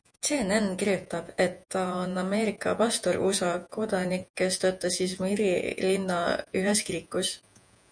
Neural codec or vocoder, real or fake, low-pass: vocoder, 48 kHz, 128 mel bands, Vocos; fake; 9.9 kHz